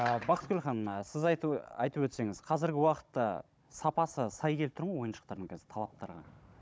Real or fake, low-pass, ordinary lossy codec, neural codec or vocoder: fake; none; none; codec, 16 kHz, 16 kbps, FunCodec, trained on Chinese and English, 50 frames a second